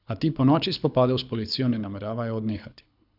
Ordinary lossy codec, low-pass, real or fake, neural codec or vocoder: none; 5.4 kHz; fake; codec, 24 kHz, 6 kbps, HILCodec